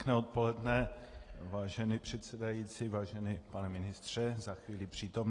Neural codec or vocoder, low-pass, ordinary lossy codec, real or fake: none; 10.8 kHz; AAC, 32 kbps; real